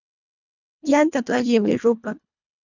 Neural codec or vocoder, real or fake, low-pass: codec, 24 kHz, 1.5 kbps, HILCodec; fake; 7.2 kHz